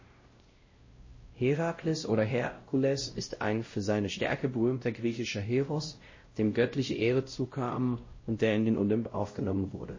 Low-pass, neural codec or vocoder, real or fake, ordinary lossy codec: 7.2 kHz; codec, 16 kHz, 0.5 kbps, X-Codec, WavLM features, trained on Multilingual LibriSpeech; fake; MP3, 32 kbps